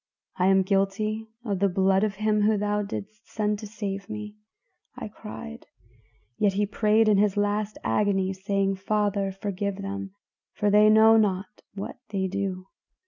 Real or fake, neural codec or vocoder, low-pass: real; none; 7.2 kHz